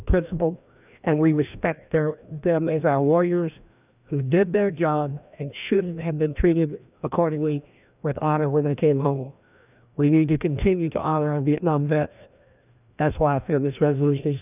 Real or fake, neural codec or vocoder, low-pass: fake; codec, 16 kHz, 1 kbps, FreqCodec, larger model; 3.6 kHz